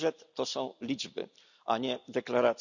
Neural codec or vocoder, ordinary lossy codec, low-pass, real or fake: none; none; 7.2 kHz; real